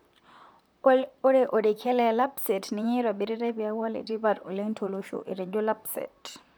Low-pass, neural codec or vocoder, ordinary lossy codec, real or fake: none; vocoder, 44.1 kHz, 128 mel bands, Pupu-Vocoder; none; fake